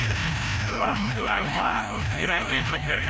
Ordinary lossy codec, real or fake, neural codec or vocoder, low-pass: none; fake; codec, 16 kHz, 0.5 kbps, FreqCodec, larger model; none